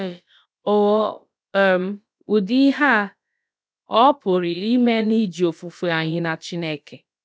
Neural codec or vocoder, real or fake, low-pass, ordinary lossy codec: codec, 16 kHz, about 1 kbps, DyCAST, with the encoder's durations; fake; none; none